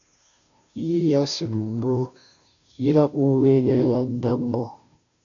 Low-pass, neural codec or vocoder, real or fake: 7.2 kHz; codec, 16 kHz, 0.5 kbps, FunCodec, trained on Chinese and English, 25 frames a second; fake